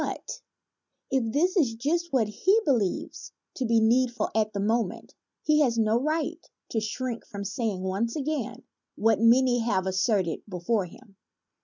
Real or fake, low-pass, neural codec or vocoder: real; 7.2 kHz; none